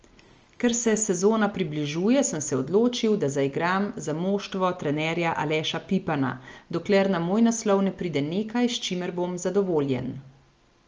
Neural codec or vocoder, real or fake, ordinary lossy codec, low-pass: none; real; Opus, 24 kbps; 7.2 kHz